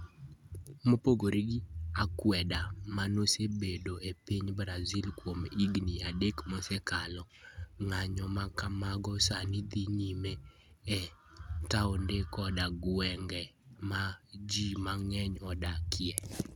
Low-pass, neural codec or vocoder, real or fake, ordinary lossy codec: 19.8 kHz; none; real; none